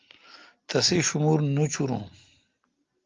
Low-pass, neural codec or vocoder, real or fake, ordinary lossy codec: 7.2 kHz; none; real; Opus, 32 kbps